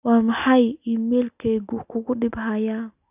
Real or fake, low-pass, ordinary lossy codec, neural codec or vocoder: real; 3.6 kHz; none; none